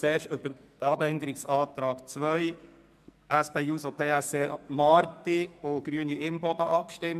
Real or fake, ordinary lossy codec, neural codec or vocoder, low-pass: fake; MP3, 96 kbps; codec, 44.1 kHz, 2.6 kbps, SNAC; 14.4 kHz